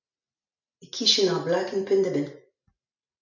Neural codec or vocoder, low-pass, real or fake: none; 7.2 kHz; real